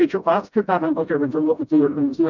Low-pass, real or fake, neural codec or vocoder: 7.2 kHz; fake; codec, 16 kHz, 0.5 kbps, FreqCodec, smaller model